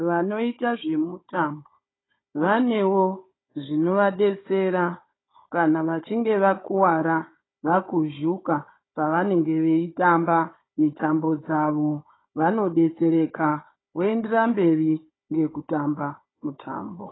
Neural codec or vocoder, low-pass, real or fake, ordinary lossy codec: codec, 16 kHz, 16 kbps, FunCodec, trained on Chinese and English, 50 frames a second; 7.2 kHz; fake; AAC, 16 kbps